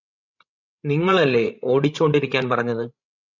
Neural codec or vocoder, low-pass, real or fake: codec, 16 kHz, 16 kbps, FreqCodec, larger model; 7.2 kHz; fake